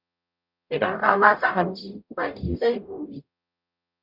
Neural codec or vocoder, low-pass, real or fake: codec, 44.1 kHz, 0.9 kbps, DAC; 5.4 kHz; fake